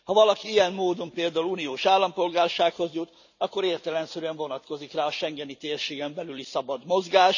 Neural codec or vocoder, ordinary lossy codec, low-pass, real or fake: none; none; 7.2 kHz; real